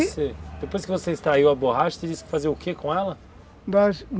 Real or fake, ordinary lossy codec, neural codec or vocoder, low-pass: real; none; none; none